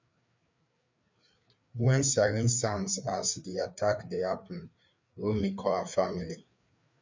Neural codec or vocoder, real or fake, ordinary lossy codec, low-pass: codec, 16 kHz, 4 kbps, FreqCodec, larger model; fake; MP3, 64 kbps; 7.2 kHz